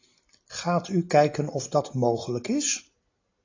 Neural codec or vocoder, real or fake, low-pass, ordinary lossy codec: none; real; 7.2 kHz; AAC, 32 kbps